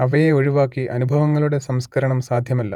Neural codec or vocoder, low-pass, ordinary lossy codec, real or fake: vocoder, 48 kHz, 128 mel bands, Vocos; 19.8 kHz; none; fake